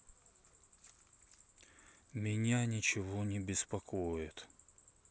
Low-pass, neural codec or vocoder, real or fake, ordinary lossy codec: none; none; real; none